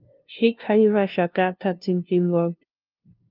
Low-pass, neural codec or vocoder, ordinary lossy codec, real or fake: 5.4 kHz; codec, 16 kHz, 0.5 kbps, FunCodec, trained on LibriTTS, 25 frames a second; Opus, 24 kbps; fake